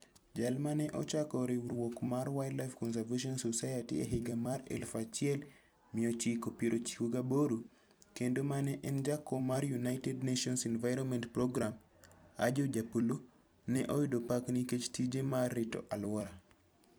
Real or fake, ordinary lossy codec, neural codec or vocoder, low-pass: fake; none; vocoder, 44.1 kHz, 128 mel bands every 256 samples, BigVGAN v2; none